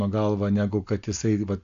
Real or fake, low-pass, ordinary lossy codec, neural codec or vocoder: real; 7.2 kHz; Opus, 64 kbps; none